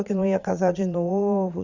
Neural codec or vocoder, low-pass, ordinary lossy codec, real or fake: vocoder, 22.05 kHz, 80 mel bands, Vocos; 7.2 kHz; Opus, 64 kbps; fake